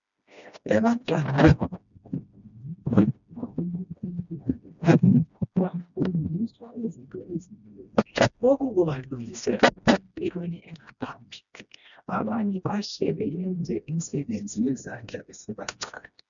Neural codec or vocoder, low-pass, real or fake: codec, 16 kHz, 1 kbps, FreqCodec, smaller model; 7.2 kHz; fake